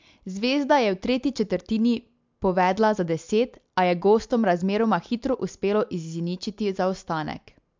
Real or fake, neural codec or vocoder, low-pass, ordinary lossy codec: real; none; 7.2 kHz; MP3, 64 kbps